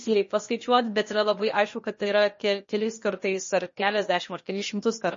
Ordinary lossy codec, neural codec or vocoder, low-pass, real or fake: MP3, 32 kbps; codec, 16 kHz, 0.8 kbps, ZipCodec; 7.2 kHz; fake